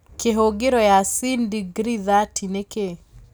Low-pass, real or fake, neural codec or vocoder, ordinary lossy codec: none; real; none; none